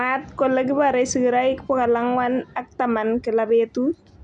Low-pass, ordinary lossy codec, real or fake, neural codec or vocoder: 9.9 kHz; none; real; none